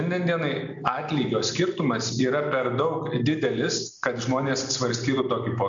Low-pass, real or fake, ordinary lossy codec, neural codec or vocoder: 7.2 kHz; real; MP3, 64 kbps; none